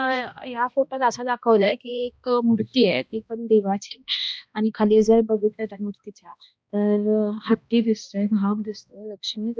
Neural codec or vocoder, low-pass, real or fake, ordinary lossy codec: codec, 16 kHz, 1 kbps, X-Codec, HuBERT features, trained on balanced general audio; none; fake; none